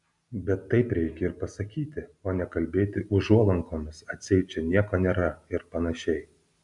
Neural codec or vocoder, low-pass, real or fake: none; 10.8 kHz; real